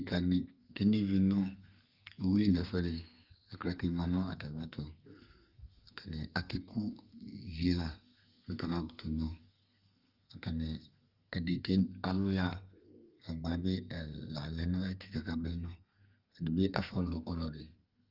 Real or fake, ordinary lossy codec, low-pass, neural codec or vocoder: fake; Opus, 32 kbps; 5.4 kHz; codec, 32 kHz, 1.9 kbps, SNAC